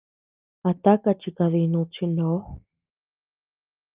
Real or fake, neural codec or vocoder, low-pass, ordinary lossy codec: real; none; 3.6 kHz; Opus, 24 kbps